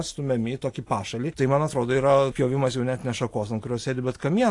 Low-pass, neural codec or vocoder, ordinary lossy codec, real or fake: 10.8 kHz; none; AAC, 48 kbps; real